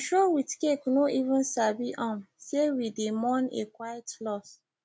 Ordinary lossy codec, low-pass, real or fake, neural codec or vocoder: none; none; real; none